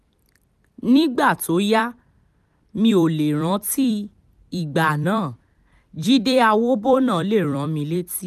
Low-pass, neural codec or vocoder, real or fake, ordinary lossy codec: 14.4 kHz; vocoder, 44.1 kHz, 128 mel bands every 256 samples, BigVGAN v2; fake; none